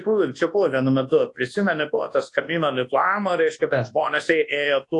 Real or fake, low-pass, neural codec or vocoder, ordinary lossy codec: fake; 10.8 kHz; codec, 24 kHz, 0.9 kbps, WavTokenizer, large speech release; AAC, 48 kbps